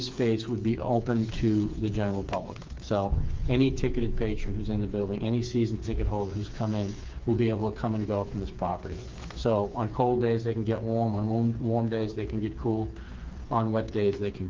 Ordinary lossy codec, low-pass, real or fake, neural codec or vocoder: Opus, 24 kbps; 7.2 kHz; fake; codec, 16 kHz, 4 kbps, FreqCodec, smaller model